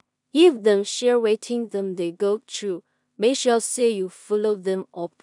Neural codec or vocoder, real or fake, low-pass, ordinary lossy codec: codec, 16 kHz in and 24 kHz out, 0.4 kbps, LongCat-Audio-Codec, two codebook decoder; fake; 10.8 kHz; none